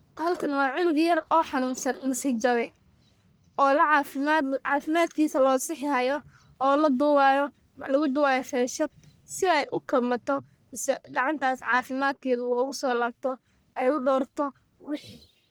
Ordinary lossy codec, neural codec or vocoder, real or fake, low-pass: none; codec, 44.1 kHz, 1.7 kbps, Pupu-Codec; fake; none